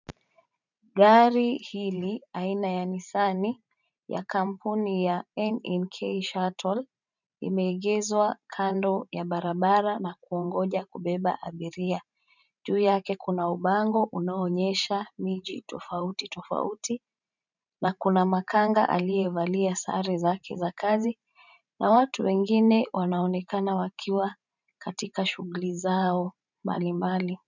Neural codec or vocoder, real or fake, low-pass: vocoder, 44.1 kHz, 128 mel bands every 512 samples, BigVGAN v2; fake; 7.2 kHz